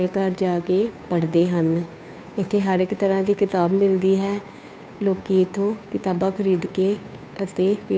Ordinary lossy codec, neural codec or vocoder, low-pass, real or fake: none; codec, 16 kHz, 2 kbps, FunCodec, trained on Chinese and English, 25 frames a second; none; fake